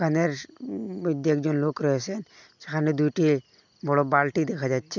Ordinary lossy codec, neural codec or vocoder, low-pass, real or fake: none; none; 7.2 kHz; real